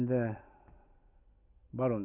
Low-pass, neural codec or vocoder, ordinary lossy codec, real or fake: 3.6 kHz; none; none; real